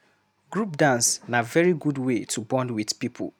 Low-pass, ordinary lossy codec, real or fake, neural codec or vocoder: 19.8 kHz; none; real; none